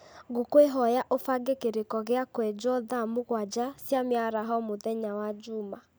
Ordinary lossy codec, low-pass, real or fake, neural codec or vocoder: none; none; real; none